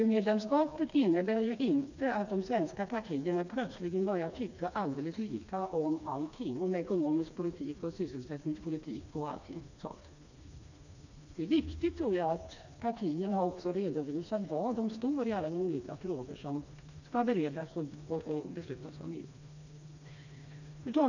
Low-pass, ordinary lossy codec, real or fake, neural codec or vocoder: 7.2 kHz; none; fake; codec, 16 kHz, 2 kbps, FreqCodec, smaller model